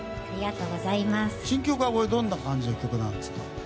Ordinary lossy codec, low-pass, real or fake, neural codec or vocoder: none; none; real; none